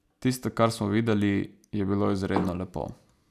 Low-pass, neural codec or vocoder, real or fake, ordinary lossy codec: 14.4 kHz; none; real; none